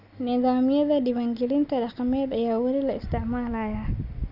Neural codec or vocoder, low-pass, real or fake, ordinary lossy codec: none; 5.4 kHz; real; none